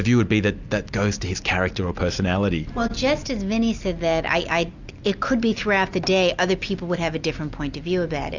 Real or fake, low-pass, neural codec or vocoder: real; 7.2 kHz; none